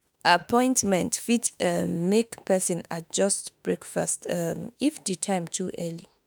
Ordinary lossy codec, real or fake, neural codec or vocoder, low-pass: none; fake; autoencoder, 48 kHz, 32 numbers a frame, DAC-VAE, trained on Japanese speech; none